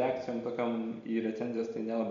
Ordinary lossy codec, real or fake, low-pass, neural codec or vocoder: MP3, 48 kbps; real; 7.2 kHz; none